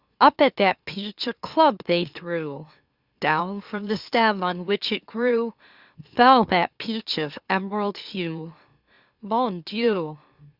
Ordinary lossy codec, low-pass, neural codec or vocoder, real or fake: Opus, 64 kbps; 5.4 kHz; autoencoder, 44.1 kHz, a latent of 192 numbers a frame, MeloTTS; fake